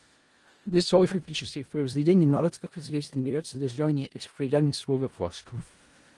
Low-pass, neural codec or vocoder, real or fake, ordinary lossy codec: 10.8 kHz; codec, 16 kHz in and 24 kHz out, 0.4 kbps, LongCat-Audio-Codec, four codebook decoder; fake; Opus, 24 kbps